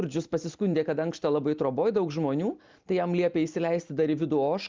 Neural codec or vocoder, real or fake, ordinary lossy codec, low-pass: none; real; Opus, 24 kbps; 7.2 kHz